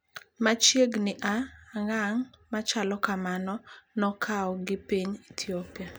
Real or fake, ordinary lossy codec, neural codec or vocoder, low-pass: real; none; none; none